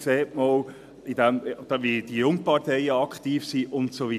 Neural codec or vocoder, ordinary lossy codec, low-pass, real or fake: none; AAC, 96 kbps; 14.4 kHz; real